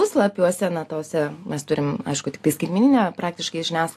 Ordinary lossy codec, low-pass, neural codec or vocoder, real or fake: AAC, 64 kbps; 14.4 kHz; none; real